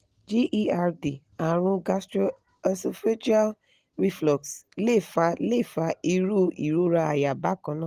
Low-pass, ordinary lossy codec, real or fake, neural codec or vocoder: 14.4 kHz; Opus, 24 kbps; real; none